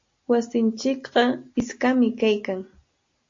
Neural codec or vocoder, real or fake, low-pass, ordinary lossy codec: none; real; 7.2 kHz; AAC, 48 kbps